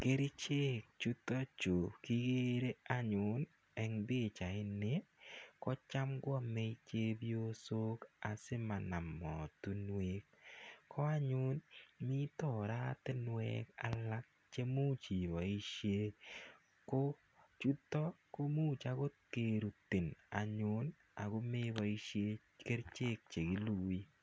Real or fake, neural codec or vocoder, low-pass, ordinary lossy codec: real; none; none; none